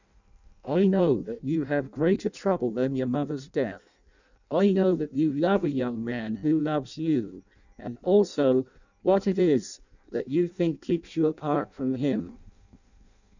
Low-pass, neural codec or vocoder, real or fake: 7.2 kHz; codec, 16 kHz in and 24 kHz out, 0.6 kbps, FireRedTTS-2 codec; fake